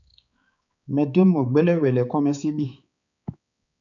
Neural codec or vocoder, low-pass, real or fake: codec, 16 kHz, 4 kbps, X-Codec, HuBERT features, trained on balanced general audio; 7.2 kHz; fake